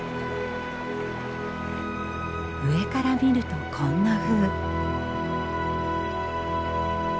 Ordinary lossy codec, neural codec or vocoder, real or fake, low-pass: none; none; real; none